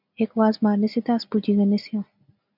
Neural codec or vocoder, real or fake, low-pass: none; real; 5.4 kHz